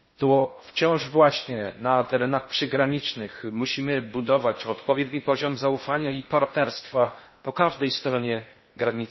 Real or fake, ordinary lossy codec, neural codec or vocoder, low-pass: fake; MP3, 24 kbps; codec, 16 kHz in and 24 kHz out, 0.6 kbps, FocalCodec, streaming, 2048 codes; 7.2 kHz